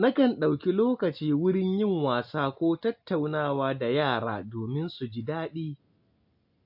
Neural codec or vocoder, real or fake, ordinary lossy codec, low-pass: none; real; AAC, 48 kbps; 5.4 kHz